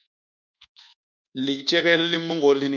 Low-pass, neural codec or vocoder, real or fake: 7.2 kHz; codec, 24 kHz, 1.2 kbps, DualCodec; fake